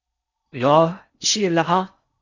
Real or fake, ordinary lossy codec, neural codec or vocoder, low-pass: fake; Opus, 64 kbps; codec, 16 kHz in and 24 kHz out, 0.6 kbps, FocalCodec, streaming, 4096 codes; 7.2 kHz